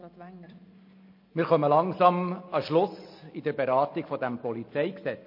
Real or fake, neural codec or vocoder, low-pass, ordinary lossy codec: real; none; 5.4 kHz; MP3, 32 kbps